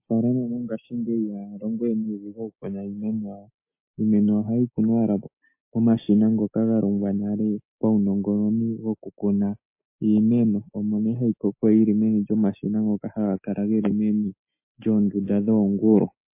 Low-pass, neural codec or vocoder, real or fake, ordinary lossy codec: 3.6 kHz; none; real; MP3, 24 kbps